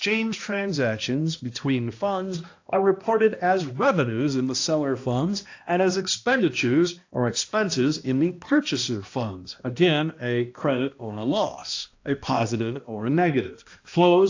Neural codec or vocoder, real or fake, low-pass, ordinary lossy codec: codec, 16 kHz, 1 kbps, X-Codec, HuBERT features, trained on balanced general audio; fake; 7.2 kHz; AAC, 48 kbps